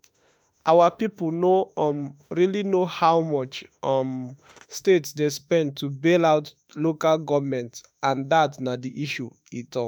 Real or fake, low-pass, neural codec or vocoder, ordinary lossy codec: fake; none; autoencoder, 48 kHz, 32 numbers a frame, DAC-VAE, trained on Japanese speech; none